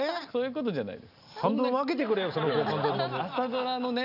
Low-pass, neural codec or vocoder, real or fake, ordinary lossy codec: 5.4 kHz; none; real; none